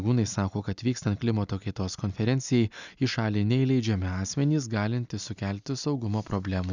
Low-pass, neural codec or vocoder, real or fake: 7.2 kHz; none; real